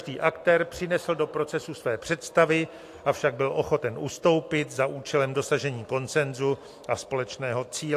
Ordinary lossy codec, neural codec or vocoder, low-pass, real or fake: AAC, 64 kbps; none; 14.4 kHz; real